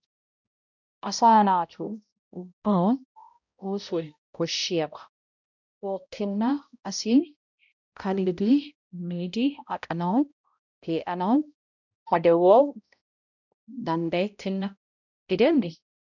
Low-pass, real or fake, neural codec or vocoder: 7.2 kHz; fake; codec, 16 kHz, 0.5 kbps, X-Codec, HuBERT features, trained on balanced general audio